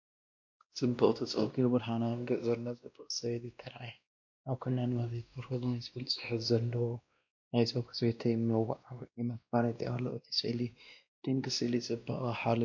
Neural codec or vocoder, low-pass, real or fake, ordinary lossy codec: codec, 16 kHz, 1 kbps, X-Codec, WavLM features, trained on Multilingual LibriSpeech; 7.2 kHz; fake; MP3, 48 kbps